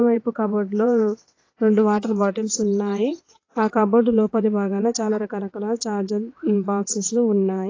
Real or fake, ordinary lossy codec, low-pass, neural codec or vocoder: fake; AAC, 32 kbps; 7.2 kHz; vocoder, 22.05 kHz, 80 mel bands, WaveNeXt